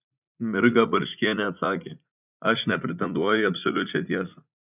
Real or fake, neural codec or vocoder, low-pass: fake; vocoder, 44.1 kHz, 80 mel bands, Vocos; 3.6 kHz